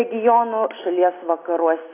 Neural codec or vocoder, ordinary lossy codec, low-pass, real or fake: none; AAC, 24 kbps; 3.6 kHz; real